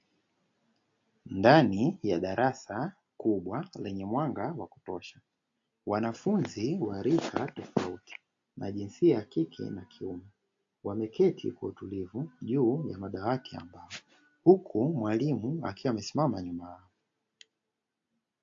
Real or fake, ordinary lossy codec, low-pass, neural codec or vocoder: real; AAC, 48 kbps; 7.2 kHz; none